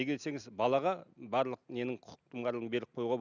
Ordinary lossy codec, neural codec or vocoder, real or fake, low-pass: none; none; real; 7.2 kHz